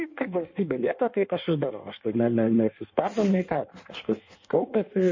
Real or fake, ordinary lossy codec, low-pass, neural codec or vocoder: fake; MP3, 32 kbps; 7.2 kHz; codec, 16 kHz in and 24 kHz out, 1.1 kbps, FireRedTTS-2 codec